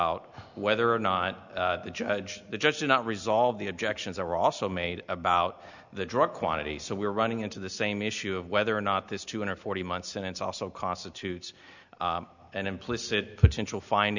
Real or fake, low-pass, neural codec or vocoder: real; 7.2 kHz; none